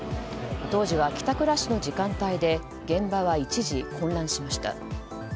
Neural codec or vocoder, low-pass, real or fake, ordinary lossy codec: none; none; real; none